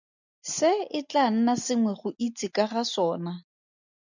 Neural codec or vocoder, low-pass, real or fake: none; 7.2 kHz; real